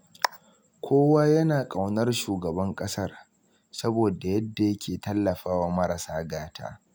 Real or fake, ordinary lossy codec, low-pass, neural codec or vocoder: real; none; none; none